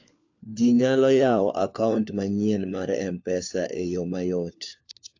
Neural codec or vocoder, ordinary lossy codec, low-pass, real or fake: codec, 16 kHz, 4 kbps, FunCodec, trained on LibriTTS, 50 frames a second; AAC, 48 kbps; 7.2 kHz; fake